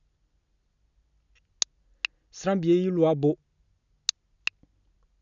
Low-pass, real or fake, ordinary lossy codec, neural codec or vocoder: 7.2 kHz; real; none; none